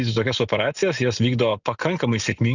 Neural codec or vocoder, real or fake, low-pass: none; real; 7.2 kHz